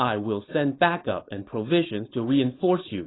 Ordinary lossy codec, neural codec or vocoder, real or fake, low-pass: AAC, 16 kbps; codec, 16 kHz, 4.8 kbps, FACodec; fake; 7.2 kHz